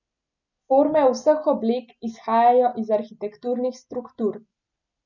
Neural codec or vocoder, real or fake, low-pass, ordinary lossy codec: none; real; 7.2 kHz; none